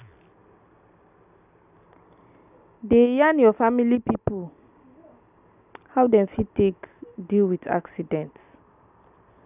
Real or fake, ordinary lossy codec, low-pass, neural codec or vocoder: real; none; 3.6 kHz; none